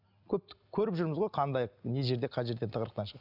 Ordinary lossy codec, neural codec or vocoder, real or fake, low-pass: none; none; real; 5.4 kHz